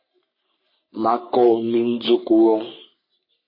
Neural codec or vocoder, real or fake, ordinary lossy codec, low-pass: codec, 44.1 kHz, 3.4 kbps, Pupu-Codec; fake; MP3, 24 kbps; 5.4 kHz